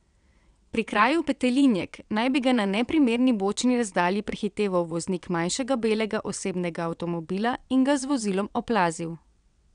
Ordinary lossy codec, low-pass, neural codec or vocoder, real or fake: none; 9.9 kHz; vocoder, 22.05 kHz, 80 mel bands, WaveNeXt; fake